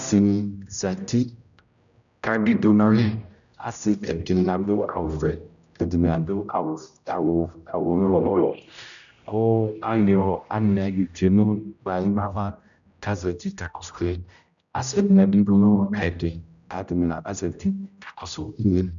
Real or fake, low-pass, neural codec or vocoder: fake; 7.2 kHz; codec, 16 kHz, 0.5 kbps, X-Codec, HuBERT features, trained on general audio